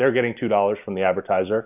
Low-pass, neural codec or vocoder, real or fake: 3.6 kHz; none; real